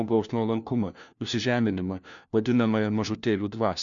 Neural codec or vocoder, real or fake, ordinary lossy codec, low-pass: codec, 16 kHz, 1 kbps, FunCodec, trained on LibriTTS, 50 frames a second; fake; MP3, 96 kbps; 7.2 kHz